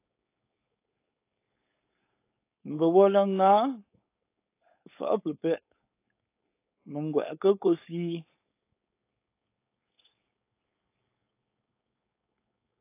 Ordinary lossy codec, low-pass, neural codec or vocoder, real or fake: AAC, 24 kbps; 3.6 kHz; codec, 16 kHz, 4.8 kbps, FACodec; fake